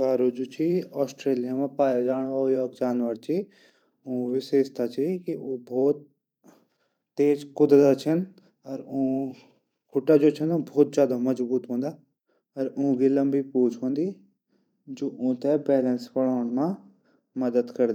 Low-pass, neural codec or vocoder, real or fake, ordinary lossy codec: 19.8 kHz; vocoder, 44.1 kHz, 128 mel bands every 512 samples, BigVGAN v2; fake; none